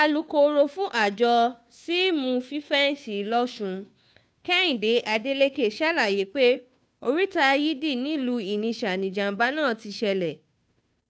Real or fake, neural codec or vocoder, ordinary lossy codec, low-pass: fake; codec, 16 kHz, 2 kbps, FunCodec, trained on Chinese and English, 25 frames a second; none; none